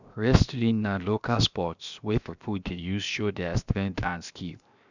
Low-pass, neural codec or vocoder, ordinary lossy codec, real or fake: 7.2 kHz; codec, 16 kHz, 0.7 kbps, FocalCodec; none; fake